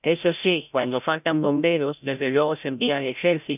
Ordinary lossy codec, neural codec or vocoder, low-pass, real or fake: none; codec, 16 kHz, 0.5 kbps, FreqCodec, larger model; 3.6 kHz; fake